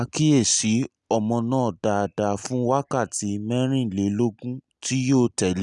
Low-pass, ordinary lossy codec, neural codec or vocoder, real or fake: 10.8 kHz; none; none; real